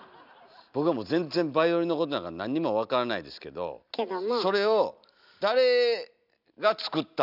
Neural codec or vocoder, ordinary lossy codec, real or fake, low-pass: none; none; real; 5.4 kHz